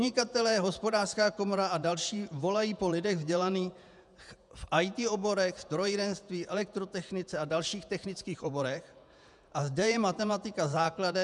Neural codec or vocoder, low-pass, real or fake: vocoder, 48 kHz, 128 mel bands, Vocos; 10.8 kHz; fake